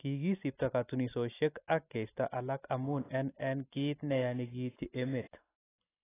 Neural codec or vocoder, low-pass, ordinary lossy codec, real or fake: none; 3.6 kHz; AAC, 16 kbps; real